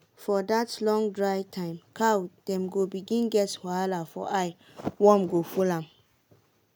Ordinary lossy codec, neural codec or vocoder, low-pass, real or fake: none; none; none; real